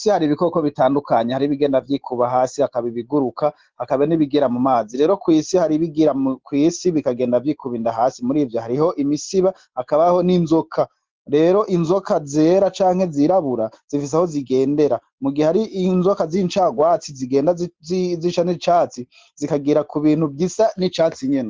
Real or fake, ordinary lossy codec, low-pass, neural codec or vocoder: real; Opus, 16 kbps; 7.2 kHz; none